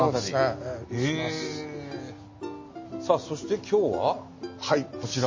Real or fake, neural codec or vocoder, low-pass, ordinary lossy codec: real; none; 7.2 kHz; MP3, 32 kbps